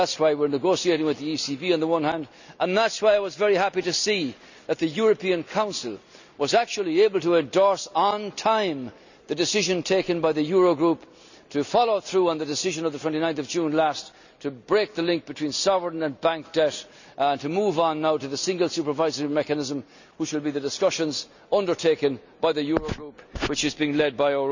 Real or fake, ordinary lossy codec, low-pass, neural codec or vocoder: real; none; 7.2 kHz; none